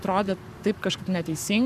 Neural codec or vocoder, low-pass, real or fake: codec, 44.1 kHz, 7.8 kbps, Pupu-Codec; 14.4 kHz; fake